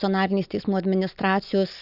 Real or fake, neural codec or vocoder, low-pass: real; none; 5.4 kHz